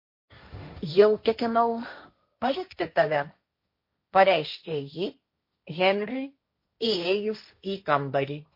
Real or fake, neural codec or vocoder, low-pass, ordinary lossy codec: fake; codec, 16 kHz, 1.1 kbps, Voila-Tokenizer; 5.4 kHz; MP3, 32 kbps